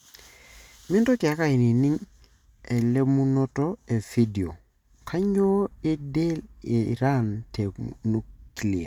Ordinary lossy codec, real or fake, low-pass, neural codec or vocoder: none; real; 19.8 kHz; none